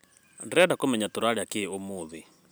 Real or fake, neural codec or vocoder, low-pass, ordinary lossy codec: real; none; none; none